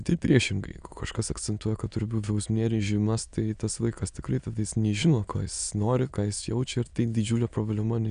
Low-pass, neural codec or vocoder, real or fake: 9.9 kHz; autoencoder, 22.05 kHz, a latent of 192 numbers a frame, VITS, trained on many speakers; fake